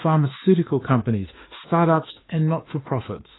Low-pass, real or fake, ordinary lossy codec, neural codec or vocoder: 7.2 kHz; fake; AAC, 16 kbps; autoencoder, 48 kHz, 32 numbers a frame, DAC-VAE, trained on Japanese speech